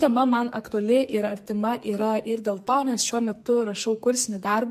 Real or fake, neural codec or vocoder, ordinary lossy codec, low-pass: fake; codec, 44.1 kHz, 2.6 kbps, SNAC; MP3, 64 kbps; 14.4 kHz